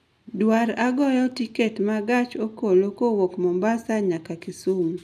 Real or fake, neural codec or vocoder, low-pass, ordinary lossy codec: real; none; 14.4 kHz; none